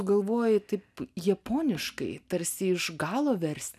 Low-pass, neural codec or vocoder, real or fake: 14.4 kHz; none; real